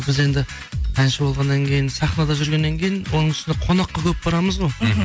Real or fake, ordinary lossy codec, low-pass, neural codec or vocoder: real; none; none; none